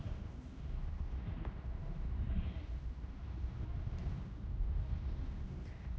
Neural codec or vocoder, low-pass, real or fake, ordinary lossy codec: codec, 16 kHz, 1 kbps, X-Codec, HuBERT features, trained on general audio; none; fake; none